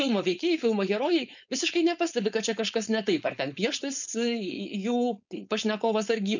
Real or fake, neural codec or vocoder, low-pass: fake; codec, 16 kHz, 4.8 kbps, FACodec; 7.2 kHz